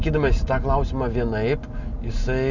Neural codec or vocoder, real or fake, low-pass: none; real; 7.2 kHz